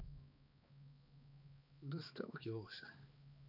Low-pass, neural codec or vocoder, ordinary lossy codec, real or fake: 5.4 kHz; codec, 16 kHz, 2 kbps, X-Codec, HuBERT features, trained on balanced general audio; none; fake